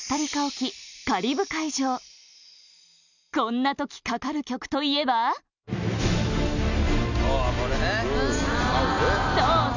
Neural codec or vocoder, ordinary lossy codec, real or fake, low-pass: none; none; real; 7.2 kHz